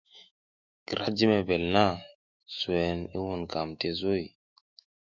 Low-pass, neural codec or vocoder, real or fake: 7.2 kHz; autoencoder, 48 kHz, 128 numbers a frame, DAC-VAE, trained on Japanese speech; fake